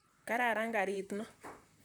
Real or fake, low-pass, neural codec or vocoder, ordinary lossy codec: fake; none; vocoder, 44.1 kHz, 128 mel bands, Pupu-Vocoder; none